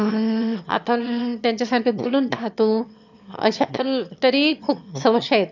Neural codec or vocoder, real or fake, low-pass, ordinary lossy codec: autoencoder, 22.05 kHz, a latent of 192 numbers a frame, VITS, trained on one speaker; fake; 7.2 kHz; none